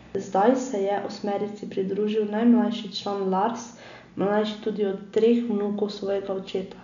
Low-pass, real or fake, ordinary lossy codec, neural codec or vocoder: 7.2 kHz; real; none; none